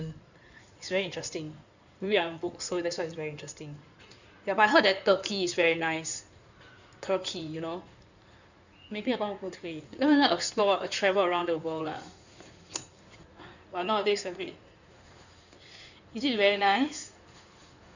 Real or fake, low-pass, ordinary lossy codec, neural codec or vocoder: fake; 7.2 kHz; none; codec, 16 kHz in and 24 kHz out, 2.2 kbps, FireRedTTS-2 codec